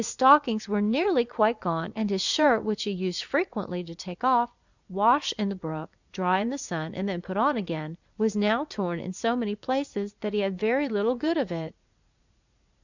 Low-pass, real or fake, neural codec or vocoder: 7.2 kHz; fake; vocoder, 44.1 kHz, 80 mel bands, Vocos